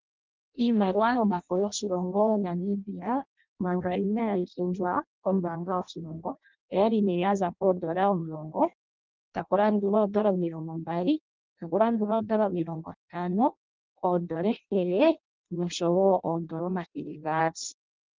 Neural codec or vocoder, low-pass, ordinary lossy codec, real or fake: codec, 16 kHz in and 24 kHz out, 0.6 kbps, FireRedTTS-2 codec; 7.2 kHz; Opus, 32 kbps; fake